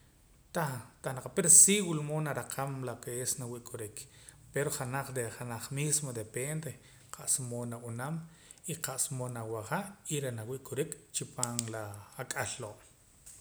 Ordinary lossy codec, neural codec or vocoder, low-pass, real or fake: none; none; none; real